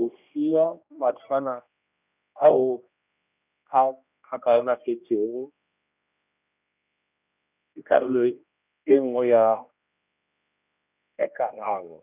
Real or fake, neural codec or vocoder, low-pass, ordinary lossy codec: fake; codec, 16 kHz, 1 kbps, X-Codec, HuBERT features, trained on general audio; 3.6 kHz; none